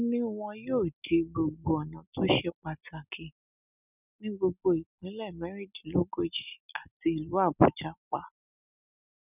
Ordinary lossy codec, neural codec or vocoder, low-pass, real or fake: none; none; 3.6 kHz; real